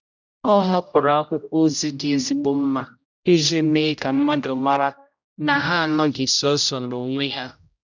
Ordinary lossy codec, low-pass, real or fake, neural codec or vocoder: none; 7.2 kHz; fake; codec, 16 kHz, 0.5 kbps, X-Codec, HuBERT features, trained on general audio